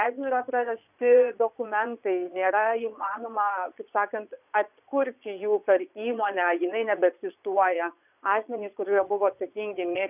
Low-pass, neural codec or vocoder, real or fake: 3.6 kHz; vocoder, 22.05 kHz, 80 mel bands, Vocos; fake